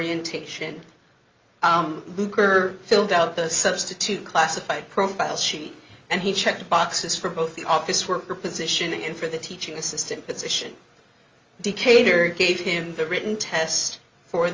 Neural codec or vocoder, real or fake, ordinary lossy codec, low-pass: none; real; Opus, 32 kbps; 7.2 kHz